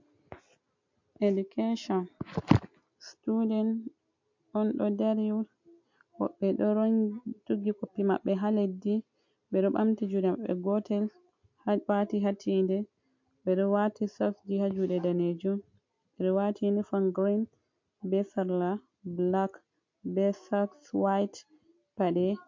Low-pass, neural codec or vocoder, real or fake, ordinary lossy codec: 7.2 kHz; none; real; MP3, 48 kbps